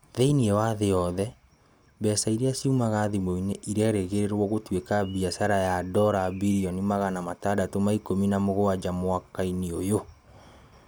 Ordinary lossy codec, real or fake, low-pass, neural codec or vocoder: none; real; none; none